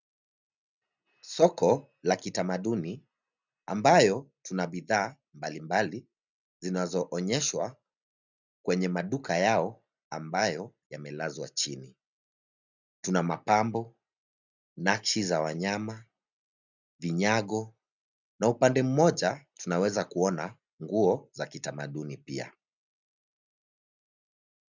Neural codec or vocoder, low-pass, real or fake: none; 7.2 kHz; real